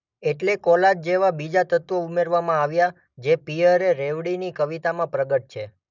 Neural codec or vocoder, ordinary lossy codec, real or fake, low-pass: none; none; real; 7.2 kHz